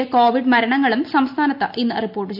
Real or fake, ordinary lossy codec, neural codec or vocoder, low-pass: real; none; none; 5.4 kHz